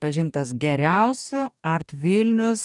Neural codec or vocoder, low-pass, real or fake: codec, 44.1 kHz, 2.6 kbps, DAC; 10.8 kHz; fake